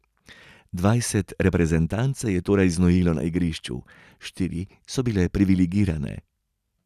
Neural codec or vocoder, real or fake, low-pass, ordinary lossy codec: none; real; 14.4 kHz; none